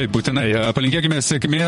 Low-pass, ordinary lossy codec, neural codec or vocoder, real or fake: 19.8 kHz; MP3, 48 kbps; vocoder, 44.1 kHz, 128 mel bands, Pupu-Vocoder; fake